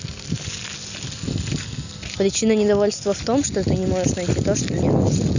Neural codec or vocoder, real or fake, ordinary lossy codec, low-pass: none; real; none; 7.2 kHz